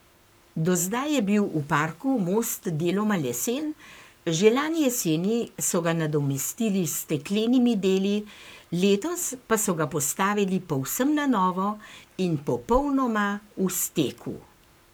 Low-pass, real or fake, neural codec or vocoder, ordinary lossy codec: none; fake; codec, 44.1 kHz, 7.8 kbps, Pupu-Codec; none